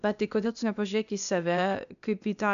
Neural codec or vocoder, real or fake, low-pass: codec, 16 kHz, 0.8 kbps, ZipCodec; fake; 7.2 kHz